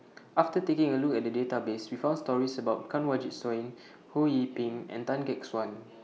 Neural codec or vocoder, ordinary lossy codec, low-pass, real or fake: none; none; none; real